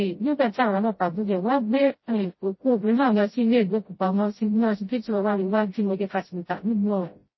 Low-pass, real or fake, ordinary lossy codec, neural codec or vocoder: 7.2 kHz; fake; MP3, 24 kbps; codec, 16 kHz, 0.5 kbps, FreqCodec, smaller model